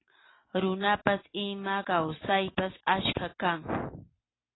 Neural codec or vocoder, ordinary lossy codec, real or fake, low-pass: none; AAC, 16 kbps; real; 7.2 kHz